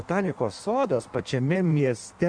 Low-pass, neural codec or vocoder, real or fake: 9.9 kHz; codec, 16 kHz in and 24 kHz out, 1.1 kbps, FireRedTTS-2 codec; fake